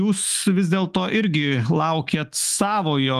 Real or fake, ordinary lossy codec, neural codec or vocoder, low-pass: fake; Opus, 32 kbps; autoencoder, 48 kHz, 128 numbers a frame, DAC-VAE, trained on Japanese speech; 14.4 kHz